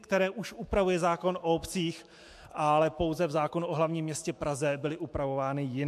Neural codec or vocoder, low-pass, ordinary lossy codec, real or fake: autoencoder, 48 kHz, 128 numbers a frame, DAC-VAE, trained on Japanese speech; 14.4 kHz; MP3, 64 kbps; fake